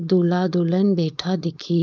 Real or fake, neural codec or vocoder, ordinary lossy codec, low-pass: fake; codec, 16 kHz, 4.8 kbps, FACodec; none; none